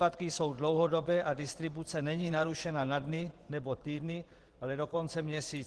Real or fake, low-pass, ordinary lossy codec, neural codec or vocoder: fake; 10.8 kHz; Opus, 16 kbps; vocoder, 24 kHz, 100 mel bands, Vocos